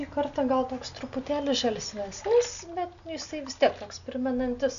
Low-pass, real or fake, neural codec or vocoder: 7.2 kHz; real; none